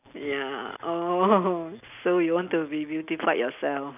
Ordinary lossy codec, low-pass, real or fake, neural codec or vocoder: none; 3.6 kHz; real; none